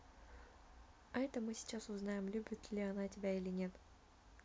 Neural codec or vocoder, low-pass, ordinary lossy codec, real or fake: none; none; none; real